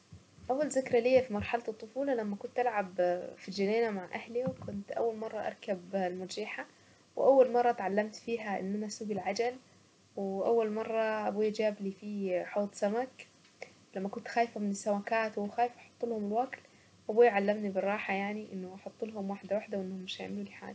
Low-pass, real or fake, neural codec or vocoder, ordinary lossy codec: none; real; none; none